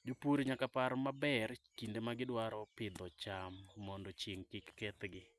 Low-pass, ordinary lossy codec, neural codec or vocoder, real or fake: none; none; none; real